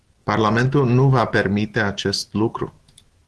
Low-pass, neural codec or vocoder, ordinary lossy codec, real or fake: 10.8 kHz; autoencoder, 48 kHz, 128 numbers a frame, DAC-VAE, trained on Japanese speech; Opus, 16 kbps; fake